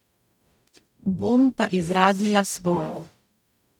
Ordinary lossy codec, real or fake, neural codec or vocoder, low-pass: none; fake; codec, 44.1 kHz, 0.9 kbps, DAC; 19.8 kHz